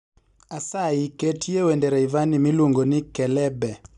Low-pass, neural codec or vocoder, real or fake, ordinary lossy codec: 10.8 kHz; none; real; none